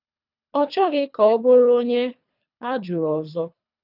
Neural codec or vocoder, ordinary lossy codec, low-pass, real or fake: codec, 24 kHz, 3 kbps, HILCodec; none; 5.4 kHz; fake